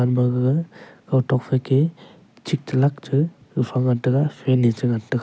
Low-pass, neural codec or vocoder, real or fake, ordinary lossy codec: none; none; real; none